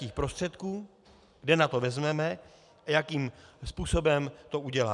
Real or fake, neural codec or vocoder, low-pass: real; none; 10.8 kHz